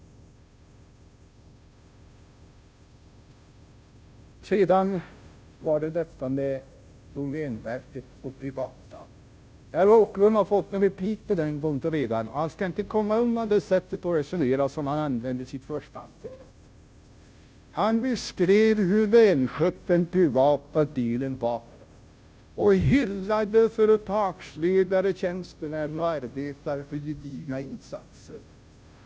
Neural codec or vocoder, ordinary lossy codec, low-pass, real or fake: codec, 16 kHz, 0.5 kbps, FunCodec, trained on Chinese and English, 25 frames a second; none; none; fake